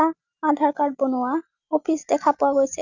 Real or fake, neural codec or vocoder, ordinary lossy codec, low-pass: real; none; none; 7.2 kHz